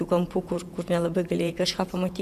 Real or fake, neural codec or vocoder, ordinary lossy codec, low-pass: fake; vocoder, 48 kHz, 128 mel bands, Vocos; MP3, 96 kbps; 14.4 kHz